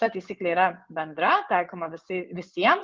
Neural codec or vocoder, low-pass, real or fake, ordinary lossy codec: vocoder, 24 kHz, 100 mel bands, Vocos; 7.2 kHz; fake; Opus, 32 kbps